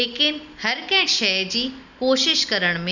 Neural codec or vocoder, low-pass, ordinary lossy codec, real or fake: none; 7.2 kHz; none; real